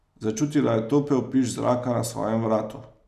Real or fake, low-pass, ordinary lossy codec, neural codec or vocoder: real; 14.4 kHz; none; none